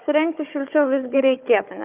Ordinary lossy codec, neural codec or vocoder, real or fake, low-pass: Opus, 24 kbps; codec, 16 kHz, 4 kbps, FunCodec, trained on Chinese and English, 50 frames a second; fake; 3.6 kHz